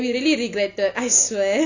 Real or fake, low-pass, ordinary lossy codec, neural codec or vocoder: real; 7.2 kHz; none; none